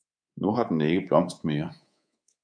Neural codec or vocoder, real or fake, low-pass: codec, 24 kHz, 3.1 kbps, DualCodec; fake; 9.9 kHz